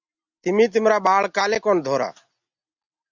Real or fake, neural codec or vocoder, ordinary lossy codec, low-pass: real; none; Opus, 64 kbps; 7.2 kHz